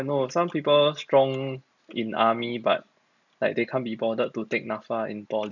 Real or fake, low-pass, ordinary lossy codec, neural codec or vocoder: real; 7.2 kHz; none; none